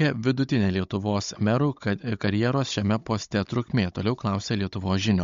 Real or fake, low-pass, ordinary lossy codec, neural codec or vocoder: fake; 7.2 kHz; MP3, 48 kbps; codec, 16 kHz, 16 kbps, FunCodec, trained on Chinese and English, 50 frames a second